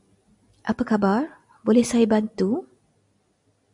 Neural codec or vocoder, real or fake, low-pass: none; real; 10.8 kHz